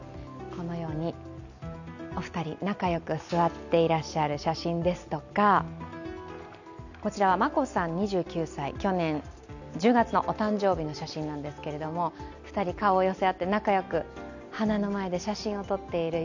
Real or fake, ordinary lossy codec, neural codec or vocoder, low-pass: real; none; none; 7.2 kHz